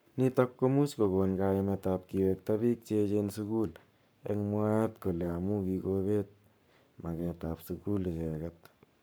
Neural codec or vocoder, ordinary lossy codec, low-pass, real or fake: codec, 44.1 kHz, 7.8 kbps, Pupu-Codec; none; none; fake